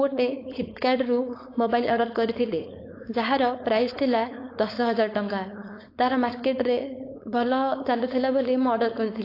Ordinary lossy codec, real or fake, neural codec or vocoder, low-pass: AAC, 32 kbps; fake; codec, 16 kHz, 4.8 kbps, FACodec; 5.4 kHz